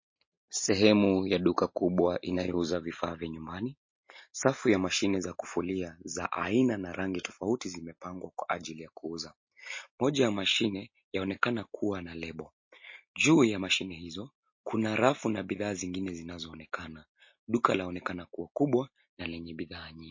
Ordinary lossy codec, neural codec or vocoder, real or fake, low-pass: MP3, 32 kbps; none; real; 7.2 kHz